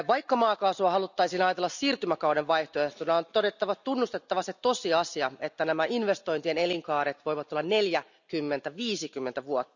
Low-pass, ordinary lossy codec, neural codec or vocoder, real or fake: 7.2 kHz; none; none; real